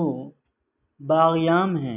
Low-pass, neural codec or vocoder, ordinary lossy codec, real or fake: 3.6 kHz; none; AAC, 32 kbps; real